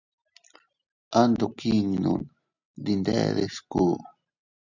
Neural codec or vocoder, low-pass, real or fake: none; 7.2 kHz; real